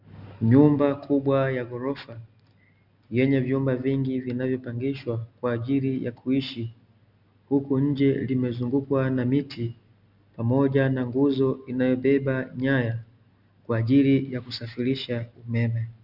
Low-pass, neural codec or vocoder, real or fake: 5.4 kHz; none; real